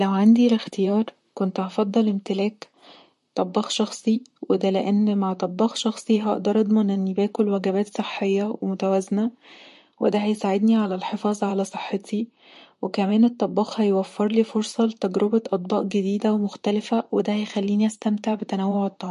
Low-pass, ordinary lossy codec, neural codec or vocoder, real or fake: 14.4 kHz; MP3, 48 kbps; vocoder, 44.1 kHz, 128 mel bands, Pupu-Vocoder; fake